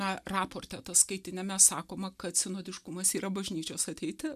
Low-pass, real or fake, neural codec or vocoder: 14.4 kHz; real; none